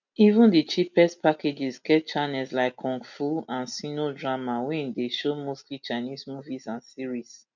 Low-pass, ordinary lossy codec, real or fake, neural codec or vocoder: 7.2 kHz; none; real; none